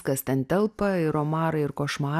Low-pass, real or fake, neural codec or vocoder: 14.4 kHz; real; none